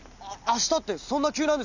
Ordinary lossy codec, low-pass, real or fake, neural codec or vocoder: none; 7.2 kHz; real; none